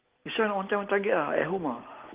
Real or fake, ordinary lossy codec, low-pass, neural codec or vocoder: real; Opus, 16 kbps; 3.6 kHz; none